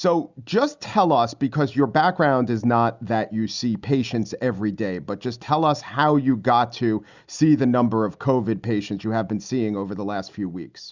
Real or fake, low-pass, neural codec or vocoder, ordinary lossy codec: real; 7.2 kHz; none; Opus, 64 kbps